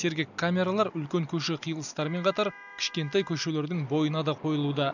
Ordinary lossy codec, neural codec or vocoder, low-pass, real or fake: none; none; 7.2 kHz; real